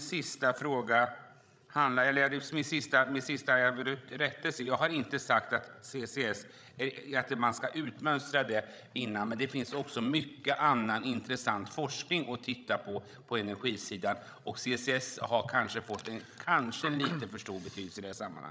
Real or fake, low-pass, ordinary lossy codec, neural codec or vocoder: fake; none; none; codec, 16 kHz, 16 kbps, FreqCodec, larger model